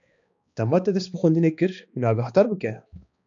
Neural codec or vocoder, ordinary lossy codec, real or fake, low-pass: codec, 16 kHz, 2 kbps, X-Codec, HuBERT features, trained on balanced general audio; AAC, 64 kbps; fake; 7.2 kHz